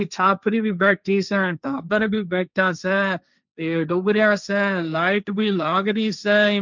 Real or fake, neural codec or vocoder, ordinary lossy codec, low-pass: fake; codec, 16 kHz, 1.1 kbps, Voila-Tokenizer; none; 7.2 kHz